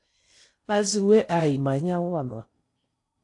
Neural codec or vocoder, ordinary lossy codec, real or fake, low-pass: codec, 16 kHz in and 24 kHz out, 0.6 kbps, FocalCodec, streaming, 4096 codes; AAC, 48 kbps; fake; 10.8 kHz